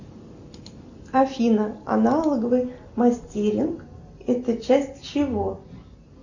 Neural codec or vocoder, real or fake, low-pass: none; real; 7.2 kHz